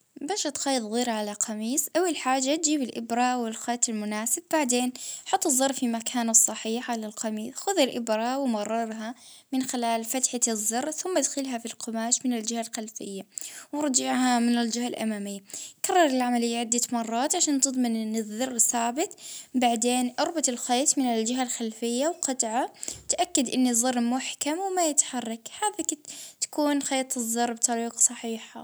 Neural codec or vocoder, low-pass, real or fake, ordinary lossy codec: none; none; real; none